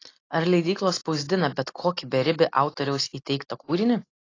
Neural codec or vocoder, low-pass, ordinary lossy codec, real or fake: none; 7.2 kHz; AAC, 32 kbps; real